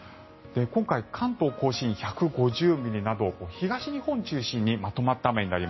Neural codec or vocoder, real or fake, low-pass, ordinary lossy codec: none; real; 7.2 kHz; MP3, 24 kbps